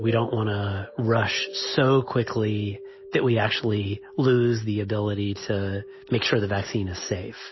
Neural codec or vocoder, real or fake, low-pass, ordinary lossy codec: none; real; 7.2 kHz; MP3, 24 kbps